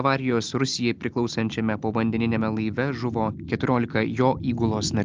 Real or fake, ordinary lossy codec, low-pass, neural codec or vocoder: real; Opus, 32 kbps; 7.2 kHz; none